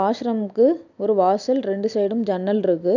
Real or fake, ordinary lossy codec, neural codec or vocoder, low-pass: real; none; none; 7.2 kHz